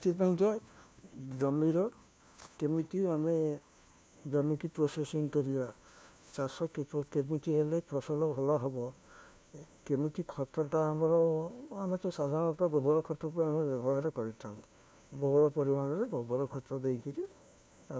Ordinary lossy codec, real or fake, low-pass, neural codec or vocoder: none; fake; none; codec, 16 kHz, 1 kbps, FunCodec, trained on LibriTTS, 50 frames a second